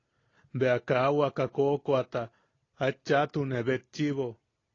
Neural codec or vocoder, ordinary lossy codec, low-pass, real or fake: none; AAC, 32 kbps; 7.2 kHz; real